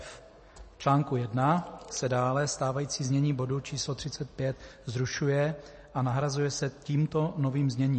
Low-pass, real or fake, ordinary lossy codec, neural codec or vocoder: 9.9 kHz; real; MP3, 32 kbps; none